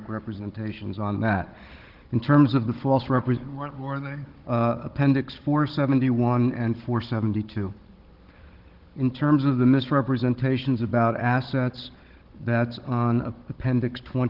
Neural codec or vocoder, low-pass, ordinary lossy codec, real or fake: codec, 16 kHz, 16 kbps, FunCodec, trained on Chinese and English, 50 frames a second; 5.4 kHz; Opus, 16 kbps; fake